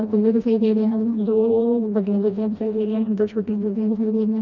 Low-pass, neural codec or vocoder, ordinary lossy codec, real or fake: 7.2 kHz; codec, 16 kHz, 1 kbps, FreqCodec, smaller model; none; fake